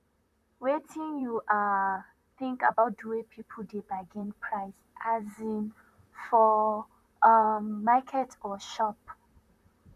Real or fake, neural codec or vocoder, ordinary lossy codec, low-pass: fake; vocoder, 44.1 kHz, 128 mel bands, Pupu-Vocoder; none; 14.4 kHz